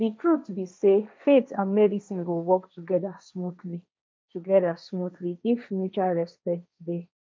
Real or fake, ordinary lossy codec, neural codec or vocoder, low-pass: fake; none; codec, 16 kHz, 1.1 kbps, Voila-Tokenizer; none